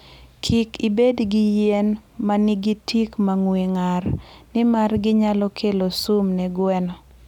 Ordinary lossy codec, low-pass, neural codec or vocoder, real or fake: none; 19.8 kHz; none; real